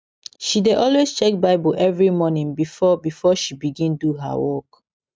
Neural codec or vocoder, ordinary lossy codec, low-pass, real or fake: none; none; none; real